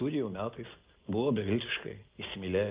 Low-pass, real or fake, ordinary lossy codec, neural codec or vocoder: 3.6 kHz; real; Opus, 24 kbps; none